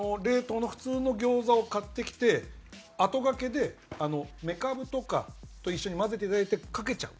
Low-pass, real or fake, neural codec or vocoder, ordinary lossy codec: none; real; none; none